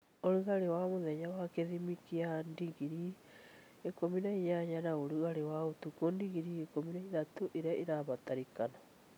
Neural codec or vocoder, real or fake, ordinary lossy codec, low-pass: none; real; none; none